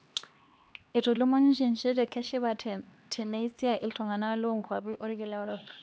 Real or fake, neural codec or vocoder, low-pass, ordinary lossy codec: fake; codec, 16 kHz, 2 kbps, X-Codec, HuBERT features, trained on LibriSpeech; none; none